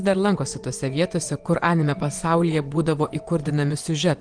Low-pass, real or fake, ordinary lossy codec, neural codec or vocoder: 9.9 kHz; fake; Opus, 24 kbps; vocoder, 24 kHz, 100 mel bands, Vocos